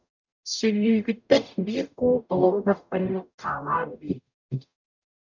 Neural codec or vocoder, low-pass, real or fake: codec, 44.1 kHz, 0.9 kbps, DAC; 7.2 kHz; fake